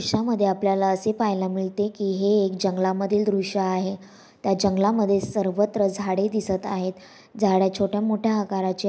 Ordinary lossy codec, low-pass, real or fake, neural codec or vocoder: none; none; real; none